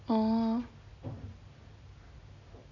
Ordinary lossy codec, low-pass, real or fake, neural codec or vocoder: none; 7.2 kHz; real; none